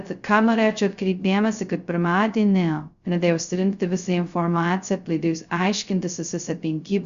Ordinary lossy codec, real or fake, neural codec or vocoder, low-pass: Opus, 64 kbps; fake; codec, 16 kHz, 0.2 kbps, FocalCodec; 7.2 kHz